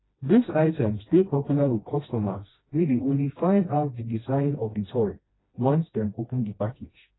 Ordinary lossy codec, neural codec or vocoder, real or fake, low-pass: AAC, 16 kbps; codec, 16 kHz, 1 kbps, FreqCodec, smaller model; fake; 7.2 kHz